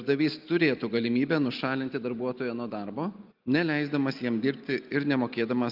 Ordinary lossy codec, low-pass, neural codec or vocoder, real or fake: Opus, 24 kbps; 5.4 kHz; none; real